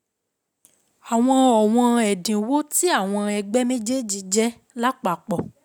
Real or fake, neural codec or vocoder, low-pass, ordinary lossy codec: real; none; none; none